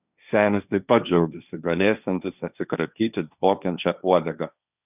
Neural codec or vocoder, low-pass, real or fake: codec, 16 kHz, 1.1 kbps, Voila-Tokenizer; 3.6 kHz; fake